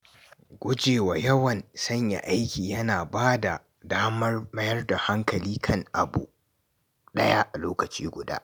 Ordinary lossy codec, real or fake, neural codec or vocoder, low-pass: none; real; none; none